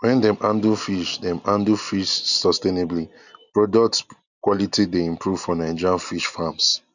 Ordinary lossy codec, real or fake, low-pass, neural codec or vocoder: none; real; 7.2 kHz; none